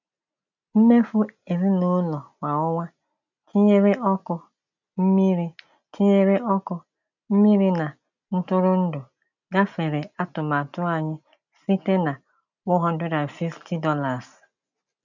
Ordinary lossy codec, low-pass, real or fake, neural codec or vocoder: none; 7.2 kHz; real; none